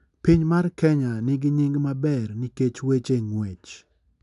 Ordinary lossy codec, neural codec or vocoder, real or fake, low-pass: none; none; real; 10.8 kHz